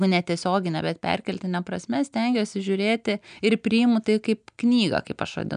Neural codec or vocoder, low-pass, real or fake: none; 9.9 kHz; real